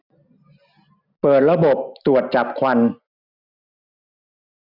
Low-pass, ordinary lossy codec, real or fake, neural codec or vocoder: 5.4 kHz; none; real; none